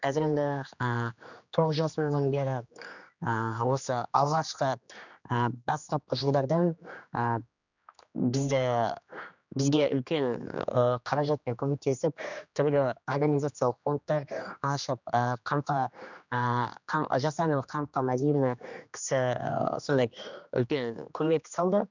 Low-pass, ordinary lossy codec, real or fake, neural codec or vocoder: 7.2 kHz; none; fake; codec, 16 kHz, 2 kbps, X-Codec, HuBERT features, trained on general audio